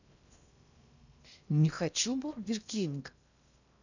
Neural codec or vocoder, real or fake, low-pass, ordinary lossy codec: codec, 16 kHz in and 24 kHz out, 0.6 kbps, FocalCodec, streaming, 2048 codes; fake; 7.2 kHz; none